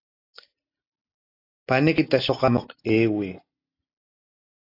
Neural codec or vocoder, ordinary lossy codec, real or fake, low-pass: none; AAC, 32 kbps; real; 5.4 kHz